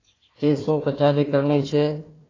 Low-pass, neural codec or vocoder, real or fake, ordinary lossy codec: 7.2 kHz; codec, 16 kHz, 1 kbps, FunCodec, trained on Chinese and English, 50 frames a second; fake; AAC, 32 kbps